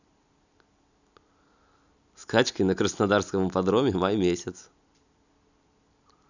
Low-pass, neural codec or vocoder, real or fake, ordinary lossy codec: 7.2 kHz; none; real; none